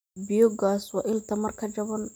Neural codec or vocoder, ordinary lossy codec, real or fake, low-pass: none; none; real; none